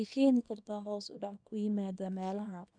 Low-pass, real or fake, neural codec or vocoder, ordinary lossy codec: 9.9 kHz; fake; codec, 24 kHz, 0.9 kbps, WavTokenizer, small release; none